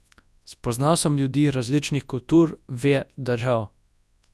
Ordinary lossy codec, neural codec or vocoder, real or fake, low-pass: none; codec, 24 kHz, 0.9 kbps, WavTokenizer, large speech release; fake; none